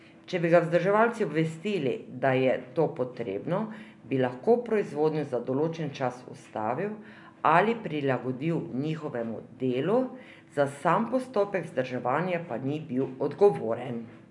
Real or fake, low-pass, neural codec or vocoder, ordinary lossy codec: real; 10.8 kHz; none; none